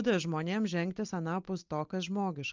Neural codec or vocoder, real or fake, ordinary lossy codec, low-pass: none; real; Opus, 24 kbps; 7.2 kHz